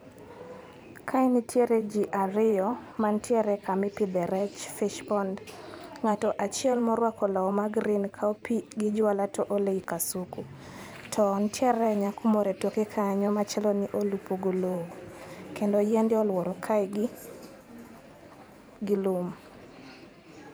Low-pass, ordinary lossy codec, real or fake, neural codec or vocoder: none; none; fake; vocoder, 44.1 kHz, 128 mel bands every 512 samples, BigVGAN v2